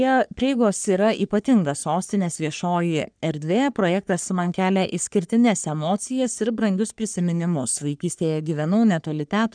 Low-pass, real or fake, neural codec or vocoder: 9.9 kHz; fake; codec, 44.1 kHz, 3.4 kbps, Pupu-Codec